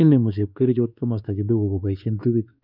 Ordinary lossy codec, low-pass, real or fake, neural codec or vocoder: none; 5.4 kHz; fake; codec, 16 kHz, 2 kbps, X-Codec, WavLM features, trained on Multilingual LibriSpeech